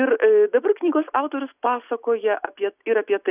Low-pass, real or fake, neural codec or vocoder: 3.6 kHz; real; none